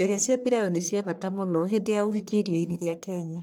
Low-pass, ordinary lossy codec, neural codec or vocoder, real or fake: none; none; codec, 44.1 kHz, 1.7 kbps, Pupu-Codec; fake